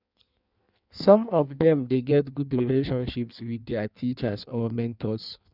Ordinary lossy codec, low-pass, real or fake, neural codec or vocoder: none; 5.4 kHz; fake; codec, 16 kHz in and 24 kHz out, 1.1 kbps, FireRedTTS-2 codec